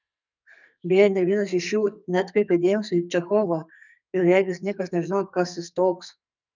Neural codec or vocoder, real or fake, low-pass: codec, 44.1 kHz, 2.6 kbps, SNAC; fake; 7.2 kHz